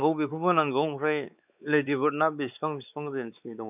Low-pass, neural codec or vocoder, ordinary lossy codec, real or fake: 3.6 kHz; codec, 16 kHz, 4 kbps, X-Codec, WavLM features, trained on Multilingual LibriSpeech; none; fake